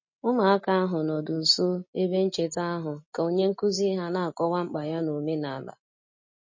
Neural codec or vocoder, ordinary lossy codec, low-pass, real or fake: none; MP3, 32 kbps; 7.2 kHz; real